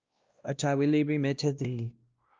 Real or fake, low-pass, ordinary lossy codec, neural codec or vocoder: fake; 7.2 kHz; Opus, 24 kbps; codec, 16 kHz, 1 kbps, X-Codec, WavLM features, trained on Multilingual LibriSpeech